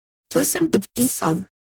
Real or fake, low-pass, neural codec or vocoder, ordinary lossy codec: fake; none; codec, 44.1 kHz, 0.9 kbps, DAC; none